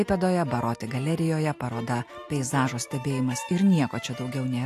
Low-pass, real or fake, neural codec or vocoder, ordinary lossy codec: 14.4 kHz; real; none; AAC, 64 kbps